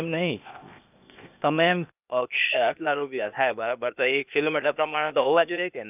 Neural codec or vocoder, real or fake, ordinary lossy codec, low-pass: codec, 16 kHz, 0.8 kbps, ZipCodec; fake; none; 3.6 kHz